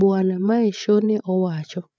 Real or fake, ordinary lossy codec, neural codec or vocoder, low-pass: fake; none; codec, 16 kHz, 8 kbps, FreqCodec, larger model; none